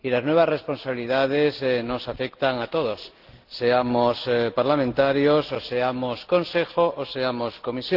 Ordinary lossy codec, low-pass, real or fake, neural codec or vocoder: Opus, 16 kbps; 5.4 kHz; real; none